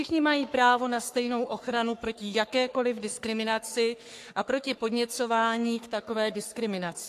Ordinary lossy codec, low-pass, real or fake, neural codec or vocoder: AAC, 64 kbps; 14.4 kHz; fake; codec, 44.1 kHz, 3.4 kbps, Pupu-Codec